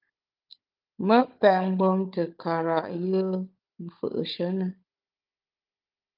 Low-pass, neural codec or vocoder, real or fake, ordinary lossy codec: 5.4 kHz; codec, 16 kHz, 16 kbps, FunCodec, trained on Chinese and English, 50 frames a second; fake; Opus, 32 kbps